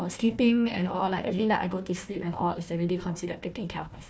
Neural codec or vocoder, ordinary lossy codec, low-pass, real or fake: codec, 16 kHz, 1 kbps, FunCodec, trained on Chinese and English, 50 frames a second; none; none; fake